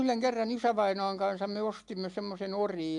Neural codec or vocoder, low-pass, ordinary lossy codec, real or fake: none; 10.8 kHz; AAC, 64 kbps; real